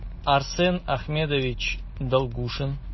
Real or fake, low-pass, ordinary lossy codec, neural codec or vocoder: real; 7.2 kHz; MP3, 24 kbps; none